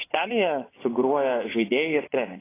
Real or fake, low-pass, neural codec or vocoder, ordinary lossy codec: fake; 3.6 kHz; vocoder, 24 kHz, 100 mel bands, Vocos; AAC, 16 kbps